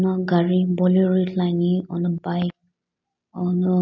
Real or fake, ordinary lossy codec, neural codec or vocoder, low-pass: real; none; none; 7.2 kHz